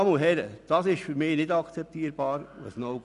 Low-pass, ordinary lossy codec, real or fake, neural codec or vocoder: 10.8 kHz; none; real; none